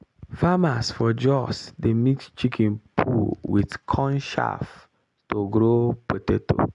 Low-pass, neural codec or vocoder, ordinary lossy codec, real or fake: 10.8 kHz; none; none; real